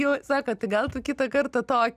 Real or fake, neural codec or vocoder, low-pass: real; none; 14.4 kHz